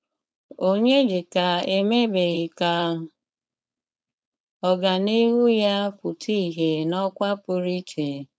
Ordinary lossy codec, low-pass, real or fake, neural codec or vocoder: none; none; fake; codec, 16 kHz, 4.8 kbps, FACodec